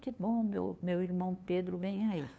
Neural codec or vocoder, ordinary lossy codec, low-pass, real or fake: codec, 16 kHz, 2 kbps, FunCodec, trained on LibriTTS, 25 frames a second; none; none; fake